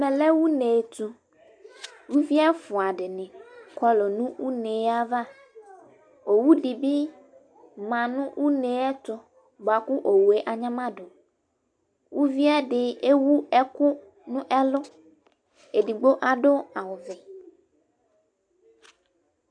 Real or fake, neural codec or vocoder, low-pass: real; none; 9.9 kHz